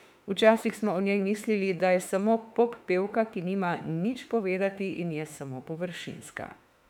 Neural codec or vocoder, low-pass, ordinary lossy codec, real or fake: autoencoder, 48 kHz, 32 numbers a frame, DAC-VAE, trained on Japanese speech; 19.8 kHz; none; fake